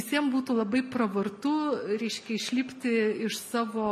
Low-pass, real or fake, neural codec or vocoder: 14.4 kHz; real; none